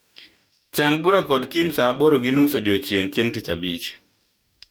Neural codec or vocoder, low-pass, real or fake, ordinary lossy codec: codec, 44.1 kHz, 2.6 kbps, DAC; none; fake; none